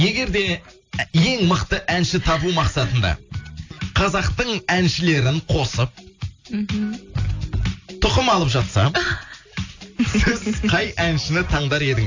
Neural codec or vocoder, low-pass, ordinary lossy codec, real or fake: none; 7.2 kHz; AAC, 48 kbps; real